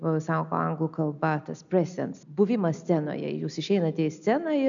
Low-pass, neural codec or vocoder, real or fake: 7.2 kHz; none; real